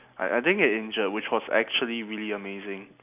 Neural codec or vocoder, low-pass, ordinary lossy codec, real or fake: none; 3.6 kHz; none; real